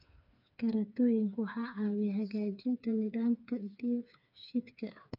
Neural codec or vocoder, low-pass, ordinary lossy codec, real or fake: codec, 16 kHz, 4 kbps, FreqCodec, smaller model; 5.4 kHz; MP3, 48 kbps; fake